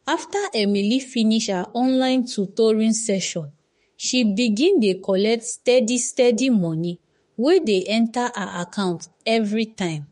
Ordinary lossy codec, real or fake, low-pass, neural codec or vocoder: MP3, 48 kbps; fake; 19.8 kHz; autoencoder, 48 kHz, 32 numbers a frame, DAC-VAE, trained on Japanese speech